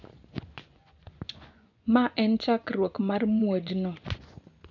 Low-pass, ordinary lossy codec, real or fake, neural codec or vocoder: 7.2 kHz; none; fake; vocoder, 24 kHz, 100 mel bands, Vocos